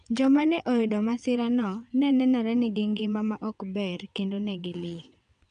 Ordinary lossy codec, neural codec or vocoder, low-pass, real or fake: none; vocoder, 22.05 kHz, 80 mel bands, WaveNeXt; 9.9 kHz; fake